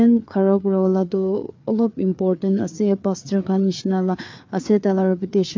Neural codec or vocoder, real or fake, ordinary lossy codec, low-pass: codec, 16 kHz, 4 kbps, FreqCodec, larger model; fake; MP3, 48 kbps; 7.2 kHz